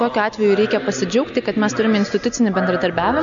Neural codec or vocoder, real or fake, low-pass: none; real; 7.2 kHz